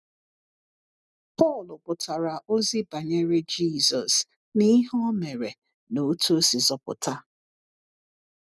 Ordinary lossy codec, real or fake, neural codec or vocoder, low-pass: none; real; none; none